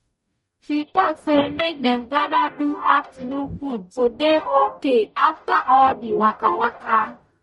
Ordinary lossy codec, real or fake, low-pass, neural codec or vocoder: MP3, 48 kbps; fake; 19.8 kHz; codec, 44.1 kHz, 0.9 kbps, DAC